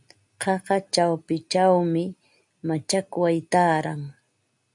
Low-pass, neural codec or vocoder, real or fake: 10.8 kHz; none; real